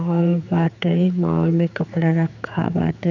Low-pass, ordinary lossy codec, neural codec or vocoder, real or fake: 7.2 kHz; none; codec, 16 kHz, 4 kbps, X-Codec, HuBERT features, trained on general audio; fake